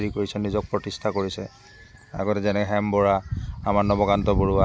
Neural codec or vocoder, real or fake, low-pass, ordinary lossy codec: none; real; none; none